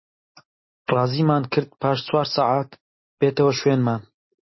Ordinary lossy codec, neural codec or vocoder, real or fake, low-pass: MP3, 24 kbps; none; real; 7.2 kHz